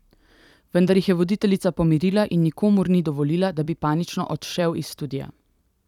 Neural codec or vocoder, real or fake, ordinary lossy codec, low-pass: vocoder, 44.1 kHz, 128 mel bands every 256 samples, BigVGAN v2; fake; none; 19.8 kHz